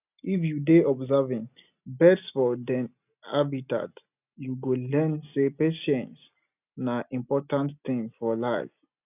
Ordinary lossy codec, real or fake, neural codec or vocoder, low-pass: none; real; none; 3.6 kHz